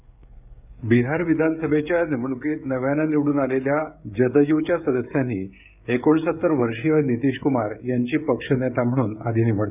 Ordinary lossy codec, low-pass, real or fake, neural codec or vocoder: none; 3.6 kHz; fake; codec, 16 kHz, 16 kbps, FreqCodec, smaller model